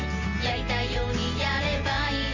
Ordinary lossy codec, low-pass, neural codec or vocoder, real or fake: none; 7.2 kHz; none; real